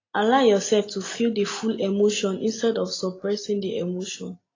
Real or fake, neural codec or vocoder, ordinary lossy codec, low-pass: real; none; AAC, 32 kbps; 7.2 kHz